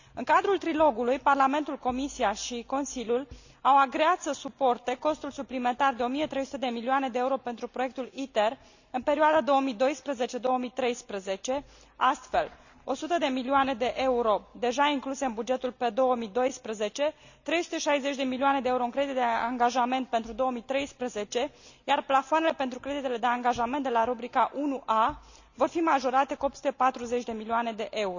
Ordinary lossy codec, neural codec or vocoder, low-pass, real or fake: none; none; 7.2 kHz; real